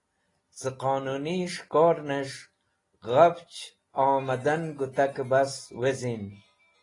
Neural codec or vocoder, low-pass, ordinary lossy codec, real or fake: none; 10.8 kHz; AAC, 32 kbps; real